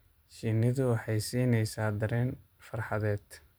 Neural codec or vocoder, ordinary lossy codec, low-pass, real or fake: vocoder, 44.1 kHz, 128 mel bands every 512 samples, BigVGAN v2; none; none; fake